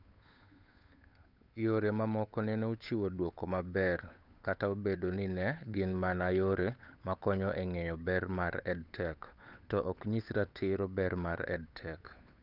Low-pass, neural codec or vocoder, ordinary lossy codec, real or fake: 5.4 kHz; codec, 16 kHz, 8 kbps, FunCodec, trained on Chinese and English, 25 frames a second; none; fake